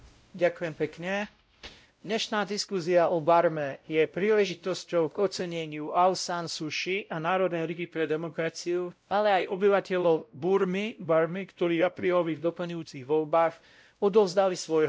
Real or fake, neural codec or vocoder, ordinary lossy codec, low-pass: fake; codec, 16 kHz, 0.5 kbps, X-Codec, WavLM features, trained on Multilingual LibriSpeech; none; none